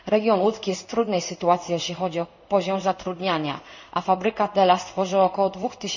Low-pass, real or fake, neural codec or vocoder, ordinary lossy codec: 7.2 kHz; fake; codec, 16 kHz in and 24 kHz out, 1 kbps, XY-Tokenizer; none